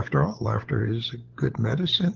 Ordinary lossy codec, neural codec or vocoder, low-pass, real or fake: Opus, 16 kbps; codec, 16 kHz, 16 kbps, FunCodec, trained on LibriTTS, 50 frames a second; 7.2 kHz; fake